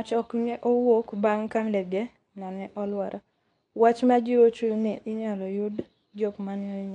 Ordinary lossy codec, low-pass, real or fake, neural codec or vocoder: none; 10.8 kHz; fake; codec, 24 kHz, 0.9 kbps, WavTokenizer, medium speech release version 2